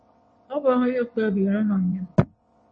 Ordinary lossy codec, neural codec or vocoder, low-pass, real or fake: MP3, 32 kbps; vocoder, 22.05 kHz, 80 mel bands, WaveNeXt; 9.9 kHz; fake